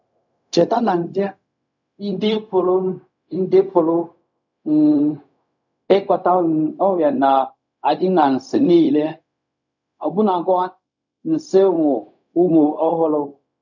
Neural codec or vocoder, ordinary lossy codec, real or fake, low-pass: codec, 16 kHz, 0.4 kbps, LongCat-Audio-Codec; none; fake; 7.2 kHz